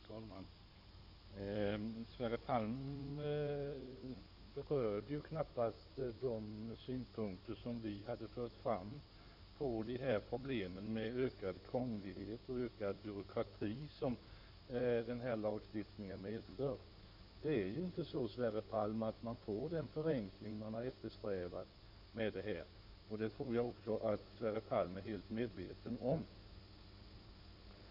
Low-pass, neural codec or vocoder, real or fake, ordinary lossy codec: 5.4 kHz; codec, 16 kHz in and 24 kHz out, 2.2 kbps, FireRedTTS-2 codec; fake; none